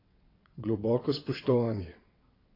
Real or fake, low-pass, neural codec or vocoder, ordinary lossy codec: fake; 5.4 kHz; vocoder, 22.05 kHz, 80 mel bands, WaveNeXt; AAC, 24 kbps